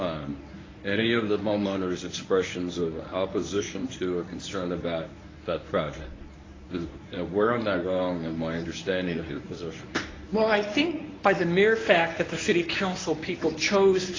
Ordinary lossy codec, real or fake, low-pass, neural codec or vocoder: AAC, 32 kbps; fake; 7.2 kHz; codec, 24 kHz, 0.9 kbps, WavTokenizer, medium speech release version 1